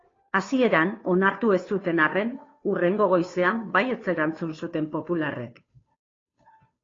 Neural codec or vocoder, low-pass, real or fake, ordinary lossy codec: codec, 16 kHz, 2 kbps, FunCodec, trained on Chinese and English, 25 frames a second; 7.2 kHz; fake; AAC, 32 kbps